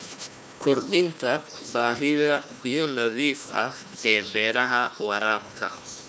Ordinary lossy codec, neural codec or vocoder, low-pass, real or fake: none; codec, 16 kHz, 1 kbps, FunCodec, trained on Chinese and English, 50 frames a second; none; fake